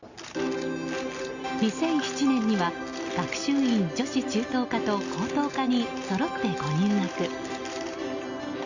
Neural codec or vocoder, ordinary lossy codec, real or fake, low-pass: none; Opus, 64 kbps; real; 7.2 kHz